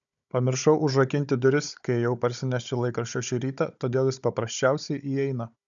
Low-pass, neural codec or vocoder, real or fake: 7.2 kHz; codec, 16 kHz, 16 kbps, FunCodec, trained on Chinese and English, 50 frames a second; fake